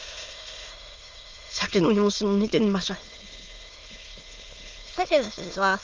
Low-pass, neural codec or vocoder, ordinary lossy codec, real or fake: 7.2 kHz; autoencoder, 22.05 kHz, a latent of 192 numbers a frame, VITS, trained on many speakers; Opus, 32 kbps; fake